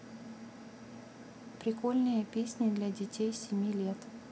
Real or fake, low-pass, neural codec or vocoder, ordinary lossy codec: real; none; none; none